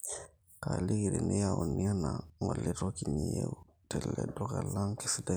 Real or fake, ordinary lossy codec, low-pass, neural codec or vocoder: real; none; none; none